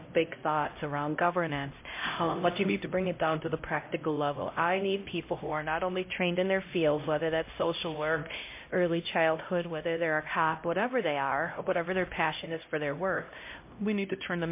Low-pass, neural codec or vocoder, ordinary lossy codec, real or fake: 3.6 kHz; codec, 16 kHz, 0.5 kbps, X-Codec, HuBERT features, trained on LibriSpeech; MP3, 24 kbps; fake